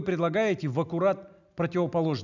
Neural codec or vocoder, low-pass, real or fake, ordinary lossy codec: none; 7.2 kHz; real; none